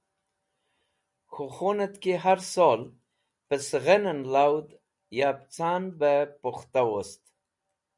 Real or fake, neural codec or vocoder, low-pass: real; none; 10.8 kHz